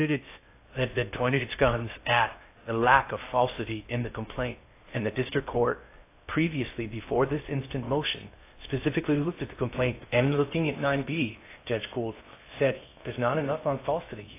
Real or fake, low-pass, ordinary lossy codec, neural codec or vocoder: fake; 3.6 kHz; AAC, 24 kbps; codec, 16 kHz in and 24 kHz out, 0.6 kbps, FocalCodec, streaming, 2048 codes